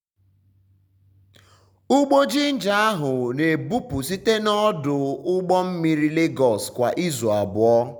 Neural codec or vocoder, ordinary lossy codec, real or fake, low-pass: none; none; real; 19.8 kHz